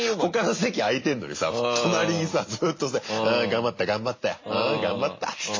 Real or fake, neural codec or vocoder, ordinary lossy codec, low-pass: real; none; MP3, 32 kbps; 7.2 kHz